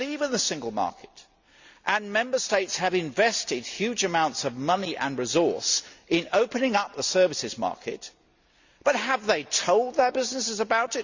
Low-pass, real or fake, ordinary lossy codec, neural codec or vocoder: 7.2 kHz; real; Opus, 64 kbps; none